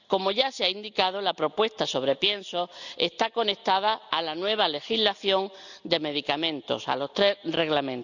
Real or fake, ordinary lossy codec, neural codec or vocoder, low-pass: real; none; none; 7.2 kHz